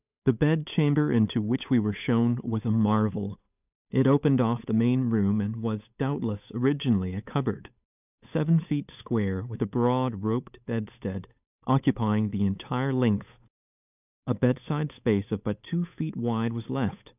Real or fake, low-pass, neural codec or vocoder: fake; 3.6 kHz; codec, 16 kHz, 8 kbps, FunCodec, trained on Chinese and English, 25 frames a second